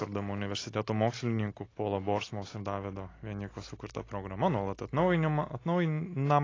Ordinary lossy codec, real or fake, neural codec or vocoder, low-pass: AAC, 32 kbps; real; none; 7.2 kHz